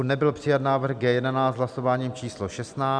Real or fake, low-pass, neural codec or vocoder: real; 9.9 kHz; none